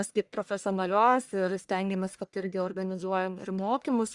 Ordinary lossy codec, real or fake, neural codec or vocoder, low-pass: Opus, 64 kbps; fake; codec, 44.1 kHz, 1.7 kbps, Pupu-Codec; 10.8 kHz